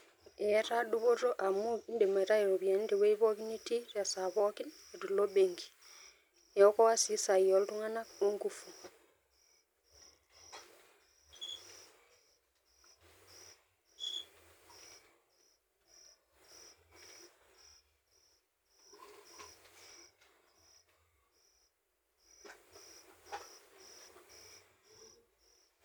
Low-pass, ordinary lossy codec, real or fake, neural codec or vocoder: none; none; real; none